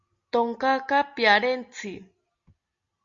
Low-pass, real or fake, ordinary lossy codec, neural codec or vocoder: 7.2 kHz; real; Opus, 64 kbps; none